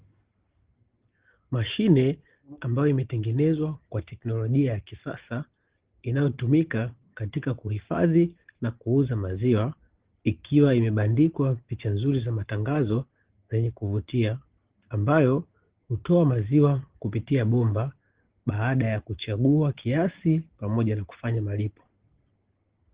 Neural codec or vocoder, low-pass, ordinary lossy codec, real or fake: none; 3.6 kHz; Opus, 16 kbps; real